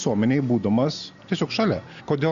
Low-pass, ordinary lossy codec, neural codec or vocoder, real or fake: 7.2 kHz; Opus, 64 kbps; none; real